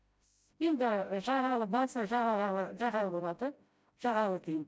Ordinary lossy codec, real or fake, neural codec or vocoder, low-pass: none; fake; codec, 16 kHz, 0.5 kbps, FreqCodec, smaller model; none